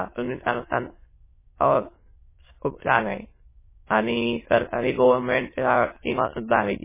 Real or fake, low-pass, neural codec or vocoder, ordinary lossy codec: fake; 3.6 kHz; autoencoder, 22.05 kHz, a latent of 192 numbers a frame, VITS, trained on many speakers; MP3, 16 kbps